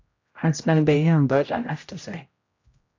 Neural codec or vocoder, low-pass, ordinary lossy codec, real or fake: codec, 16 kHz, 0.5 kbps, X-Codec, HuBERT features, trained on general audio; 7.2 kHz; AAC, 48 kbps; fake